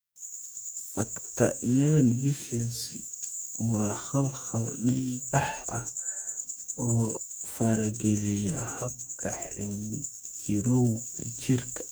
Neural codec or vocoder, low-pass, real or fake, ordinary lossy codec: codec, 44.1 kHz, 2.6 kbps, DAC; none; fake; none